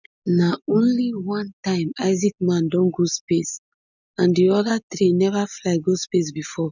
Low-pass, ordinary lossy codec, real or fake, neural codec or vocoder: 7.2 kHz; none; real; none